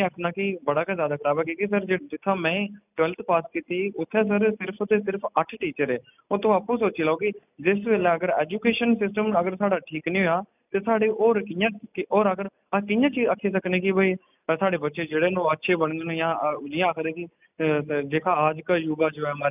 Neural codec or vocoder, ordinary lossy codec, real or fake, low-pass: none; none; real; 3.6 kHz